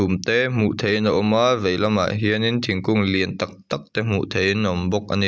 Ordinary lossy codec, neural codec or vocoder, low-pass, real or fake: none; none; none; real